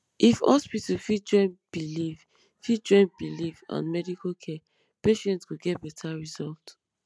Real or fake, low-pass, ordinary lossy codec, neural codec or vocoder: real; none; none; none